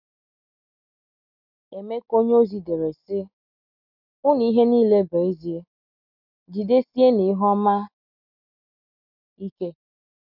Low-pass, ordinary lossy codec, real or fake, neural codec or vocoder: 5.4 kHz; none; real; none